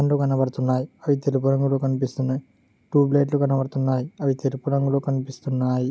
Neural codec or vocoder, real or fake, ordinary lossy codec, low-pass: none; real; none; none